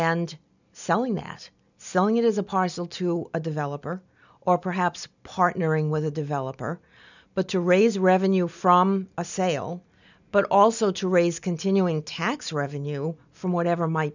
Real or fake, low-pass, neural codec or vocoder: real; 7.2 kHz; none